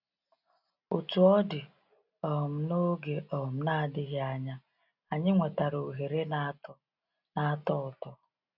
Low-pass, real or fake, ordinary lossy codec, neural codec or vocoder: 5.4 kHz; real; none; none